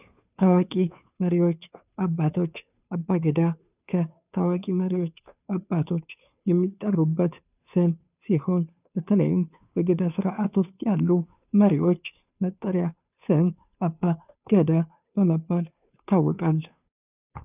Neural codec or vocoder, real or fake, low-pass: codec, 16 kHz, 2 kbps, FunCodec, trained on Chinese and English, 25 frames a second; fake; 3.6 kHz